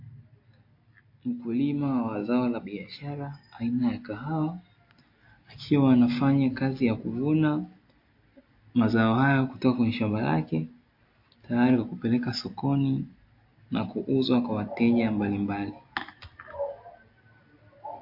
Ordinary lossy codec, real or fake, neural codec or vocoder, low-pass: MP3, 32 kbps; real; none; 5.4 kHz